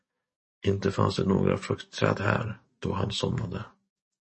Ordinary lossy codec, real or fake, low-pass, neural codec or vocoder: MP3, 32 kbps; fake; 10.8 kHz; codec, 44.1 kHz, 7.8 kbps, DAC